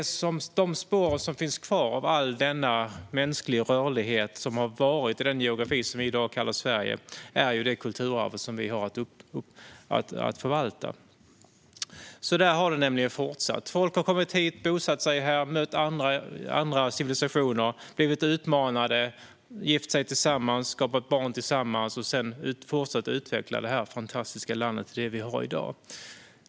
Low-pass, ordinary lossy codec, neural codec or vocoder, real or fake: none; none; none; real